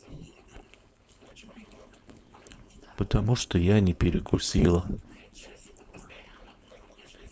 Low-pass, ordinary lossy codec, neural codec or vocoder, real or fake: none; none; codec, 16 kHz, 4.8 kbps, FACodec; fake